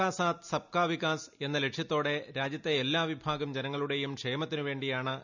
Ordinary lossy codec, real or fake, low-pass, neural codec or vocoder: none; real; 7.2 kHz; none